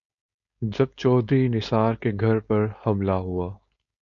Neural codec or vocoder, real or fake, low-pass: codec, 16 kHz, 4.8 kbps, FACodec; fake; 7.2 kHz